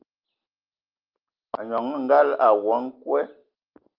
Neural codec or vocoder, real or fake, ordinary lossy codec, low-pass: none; real; Opus, 24 kbps; 5.4 kHz